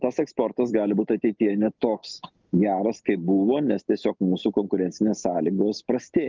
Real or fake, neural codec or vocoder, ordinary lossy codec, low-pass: real; none; Opus, 24 kbps; 7.2 kHz